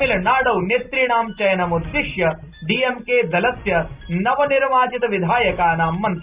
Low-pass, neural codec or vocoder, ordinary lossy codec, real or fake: 3.6 kHz; none; Opus, 64 kbps; real